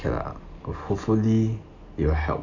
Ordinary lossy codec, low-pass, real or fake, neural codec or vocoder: none; 7.2 kHz; real; none